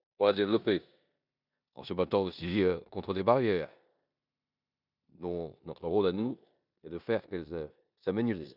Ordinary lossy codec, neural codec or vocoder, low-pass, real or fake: none; codec, 16 kHz in and 24 kHz out, 0.9 kbps, LongCat-Audio-Codec, four codebook decoder; 5.4 kHz; fake